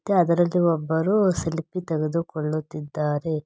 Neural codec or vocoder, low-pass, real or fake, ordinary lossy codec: none; none; real; none